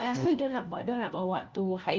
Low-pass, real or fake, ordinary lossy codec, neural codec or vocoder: 7.2 kHz; fake; Opus, 24 kbps; codec, 16 kHz, 1 kbps, FunCodec, trained on LibriTTS, 50 frames a second